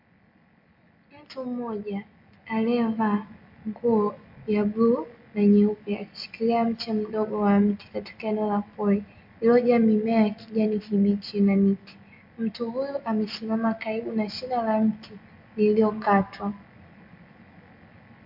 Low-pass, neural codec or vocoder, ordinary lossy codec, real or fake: 5.4 kHz; none; MP3, 48 kbps; real